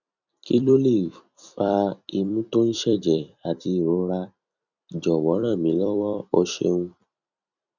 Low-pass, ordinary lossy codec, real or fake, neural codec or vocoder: 7.2 kHz; none; fake; vocoder, 44.1 kHz, 128 mel bands every 256 samples, BigVGAN v2